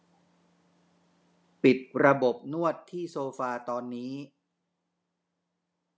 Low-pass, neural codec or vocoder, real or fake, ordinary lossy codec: none; none; real; none